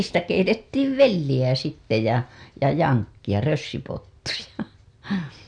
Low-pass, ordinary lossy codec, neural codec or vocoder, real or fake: 9.9 kHz; none; none; real